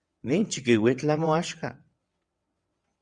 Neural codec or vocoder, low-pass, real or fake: vocoder, 22.05 kHz, 80 mel bands, WaveNeXt; 9.9 kHz; fake